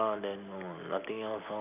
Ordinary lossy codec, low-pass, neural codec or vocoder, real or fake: none; 3.6 kHz; none; real